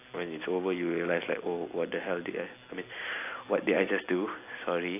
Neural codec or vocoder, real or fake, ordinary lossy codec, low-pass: none; real; AAC, 24 kbps; 3.6 kHz